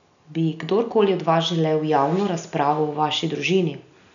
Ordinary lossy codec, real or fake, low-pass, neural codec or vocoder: none; real; 7.2 kHz; none